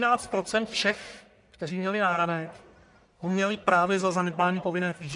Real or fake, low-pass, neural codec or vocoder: fake; 10.8 kHz; codec, 44.1 kHz, 1.7 kbps, Pupu-Codec